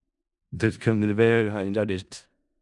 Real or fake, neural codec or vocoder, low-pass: fake; codec, 16 kHz in and 24 kHz out, 0.4 kbps, LongCat-Audio-Codec, four codebook decoder; 10.8 kHz